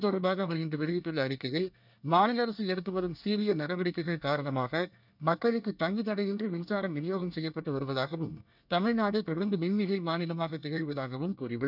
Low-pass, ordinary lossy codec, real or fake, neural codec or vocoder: 5.4 kHz; none; fake; codec, 24 kHz, 1 kbps, SNAC